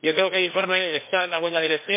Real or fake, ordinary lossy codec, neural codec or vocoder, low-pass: fake; MP3, 24 kbps; codec, 16 kHz, 1 kbps, FreqCodec, larger model; 3.6 kHz